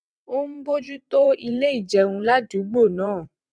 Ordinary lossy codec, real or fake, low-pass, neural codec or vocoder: none; fake; none; vocoder, 22.05 kHz, 80 mel bands, WaveNeXt